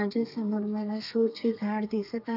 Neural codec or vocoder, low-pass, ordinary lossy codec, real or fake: codec, 32 kHz, 1.9 kbps, SNAC; 5.4 kHz; none; fake